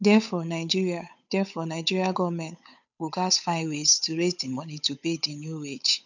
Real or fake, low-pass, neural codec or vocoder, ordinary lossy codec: fake; 7.2 kHz; codec, 16 kHz, 8 kbps, FunCodec, trained on Chinese and English, 25 frames a second; none